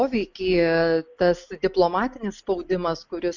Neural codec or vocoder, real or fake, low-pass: none; real; 7.2 kHz